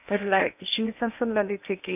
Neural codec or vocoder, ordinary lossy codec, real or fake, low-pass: codec, 16 kHz in and 24 kHz out, 0.8 kbps, FocalCodec, streaming, 65536 codes; none; fake; 3.6 kHz